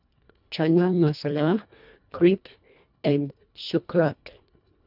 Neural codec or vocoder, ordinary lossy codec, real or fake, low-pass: codec, 24 kHz, 1.5 kbps, HILCodec; none; fake; 5.4 kHz